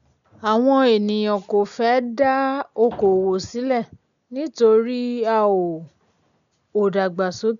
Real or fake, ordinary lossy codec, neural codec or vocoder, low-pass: real; none; none; 7.2 kHz